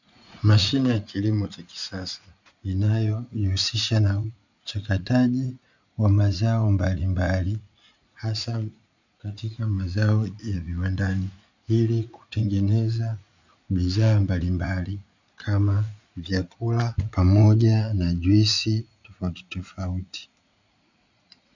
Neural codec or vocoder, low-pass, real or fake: vocoder, 44.1 kHz, 80 mel bands, Vocos; 7.2 kHz; fake